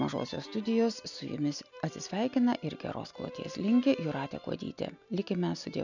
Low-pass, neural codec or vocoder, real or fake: 7.2 kHz; none; real